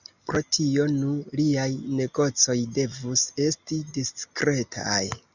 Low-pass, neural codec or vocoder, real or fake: 7.2 kHz; none; real